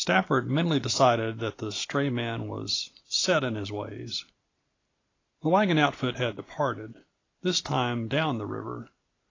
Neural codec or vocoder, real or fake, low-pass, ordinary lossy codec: none; real; 7.2 kHz; AAC, 32 kbps